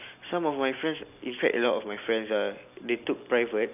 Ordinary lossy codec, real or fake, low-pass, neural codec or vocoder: none; real; 3.6 kHz; none